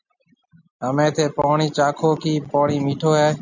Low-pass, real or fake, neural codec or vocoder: 7.2 kHz; real; none